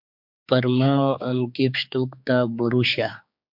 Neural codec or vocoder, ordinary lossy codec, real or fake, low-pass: codec, 16 kHz, 4 kbps, X-Codec, HuBERT features, trained on general audio; MP3, 48 kbps; fake; 5.4 kHz